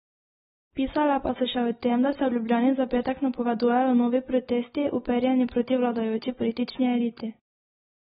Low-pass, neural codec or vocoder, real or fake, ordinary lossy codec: 19.8 kHz; none; real; AAC, 16 kbps